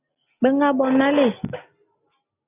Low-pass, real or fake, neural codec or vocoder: 3.6 kHz; real; none